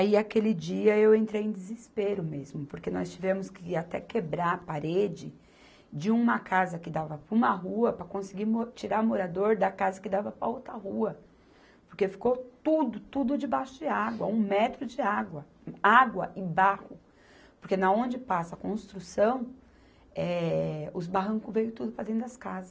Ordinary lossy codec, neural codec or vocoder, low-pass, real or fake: none; none; none; real